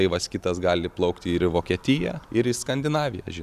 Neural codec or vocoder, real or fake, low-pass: none; real; 14.4 kHz